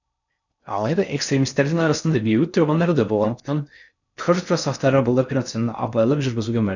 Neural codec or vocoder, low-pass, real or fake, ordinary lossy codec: codec, 16 kHz in and 24 kHz out, 0.6 kbps, FocalCodec, streaming, 4096 codes; 7.2 kHz; fake; none